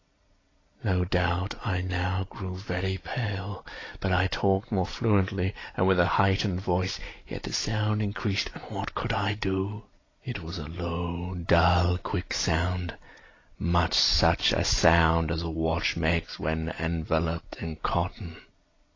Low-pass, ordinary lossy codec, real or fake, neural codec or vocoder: 7.2 kHz; AAC, 32 kbps; real; none